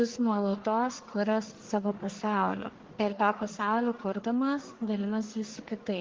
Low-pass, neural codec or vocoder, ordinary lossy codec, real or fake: 7.2 kHz; codec, 44.1 kHz, 1.7 kbps, Pupu-Codec; Opus, 16 kbps; fake